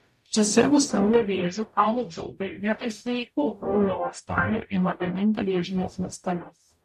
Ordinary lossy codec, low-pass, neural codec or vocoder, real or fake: MP3, 64 kbps; 14.4 kHz; codec, 44.1 kHz, 0.9 kbps, DAC; fake